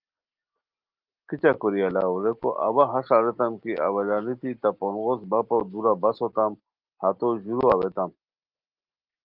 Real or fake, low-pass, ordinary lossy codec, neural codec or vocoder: real; 5.4 kHz; Opus, 32 kbps; none